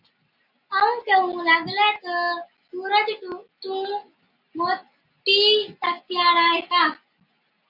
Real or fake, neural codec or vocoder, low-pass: real; none; 5.4 kHz